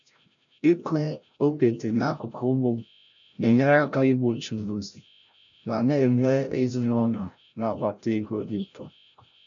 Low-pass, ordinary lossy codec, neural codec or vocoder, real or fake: 7.2 kHz; none; codec, 16 kHz, 0.5 kbps, FreqCodec, larger model; fake